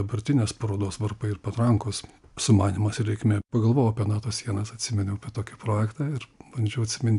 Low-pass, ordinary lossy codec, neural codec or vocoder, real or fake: 10.8 kHz; AAC, 96 kbps; none; real